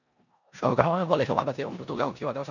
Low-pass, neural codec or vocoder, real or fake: 7.2 kHz; codec, 16 kHz in and 24 kHz out, 0.9 kbps, LongCat-Audio-Codec, four codebook decoder; fake